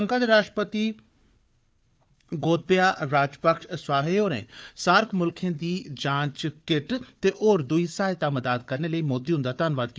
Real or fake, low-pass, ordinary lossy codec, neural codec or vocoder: fake; none; none; codec, 16 kHz, 4 kbps, FunCodec, trained on Chinese and English, 50 frames a second